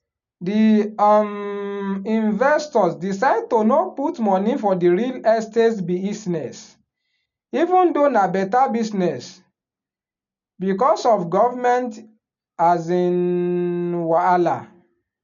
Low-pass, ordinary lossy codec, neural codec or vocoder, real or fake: 7.2 kHz; none; none; real